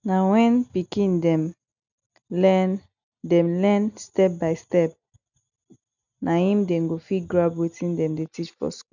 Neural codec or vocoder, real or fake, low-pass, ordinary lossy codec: none; real; 7.2 kHz; none